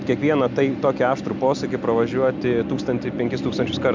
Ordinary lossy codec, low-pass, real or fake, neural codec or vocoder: MP3, 64 kbps; 7.2 kHz; real; none